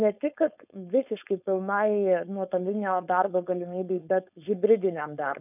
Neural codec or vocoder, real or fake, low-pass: codec, 16 kHz, 4.8 kbps, FACodec; fake; 3.6 kHz